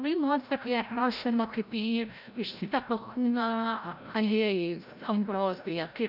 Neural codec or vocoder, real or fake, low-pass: codec, 16 kHz, 0.5 kbps, FreqCodec, larger model; fake; 5.4 kHz